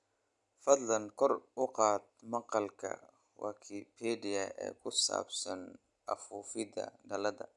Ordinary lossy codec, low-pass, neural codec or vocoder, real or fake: none; 10.8 kHz; none; real